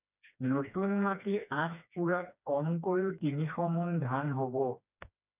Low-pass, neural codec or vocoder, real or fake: 3.6 kHz; codec, 16 kHz, 2 kbps, FreqCodec, smaller model; fake